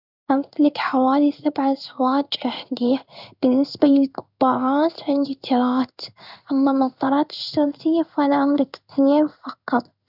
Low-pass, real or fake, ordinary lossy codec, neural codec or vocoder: 5.4 kHz; fake; none; codec, 16 kHz in and 24 kHz out, 1 kbps, XY-Tokenizer